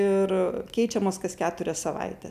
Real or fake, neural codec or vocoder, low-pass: real; none; 14.4 kHz